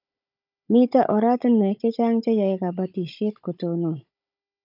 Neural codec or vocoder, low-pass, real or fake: codec, 16 kHz, 16 kbps, FunCodec, trained on Chinese and English, 50 frames a second; 5.4 kHz; fake